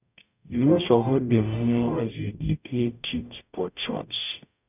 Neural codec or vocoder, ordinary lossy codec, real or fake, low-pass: codec, 44.1 kHz, 0.9 kbps, DAC; none; fake; 3.6 kHz